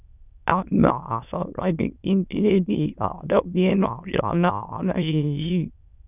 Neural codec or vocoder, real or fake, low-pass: autoencoder, 22.05 kHz, a latent of 192 numbers a frame, VITS, trained on many speakers; fake; 3.6 kHz